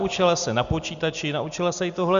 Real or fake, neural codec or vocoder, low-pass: real; none; 7.2 kHz